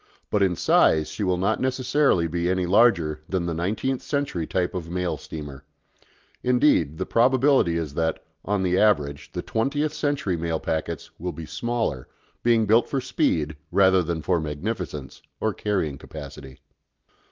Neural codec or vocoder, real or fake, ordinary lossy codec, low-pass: none; real; Opus, 24 kbps; 7.2 kHz